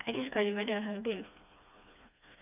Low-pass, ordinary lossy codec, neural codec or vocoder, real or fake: 3.6 kHz; none; codec, 16 kHz, 2 kbps, FreqCodec, smaller model; fake